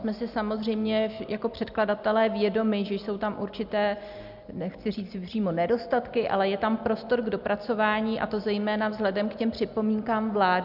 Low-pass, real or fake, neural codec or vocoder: 5.4 kHz; real; none